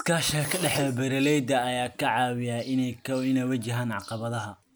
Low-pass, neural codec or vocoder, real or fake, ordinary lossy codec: none; none; real; none